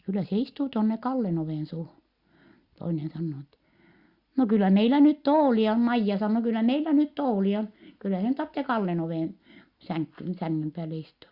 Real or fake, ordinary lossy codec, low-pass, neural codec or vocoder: real; Opus, 64 kbps; 5.4 kHz; none